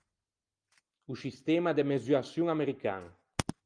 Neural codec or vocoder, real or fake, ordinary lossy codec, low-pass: none; real; Opus, 32 kbps; 9.9 kHz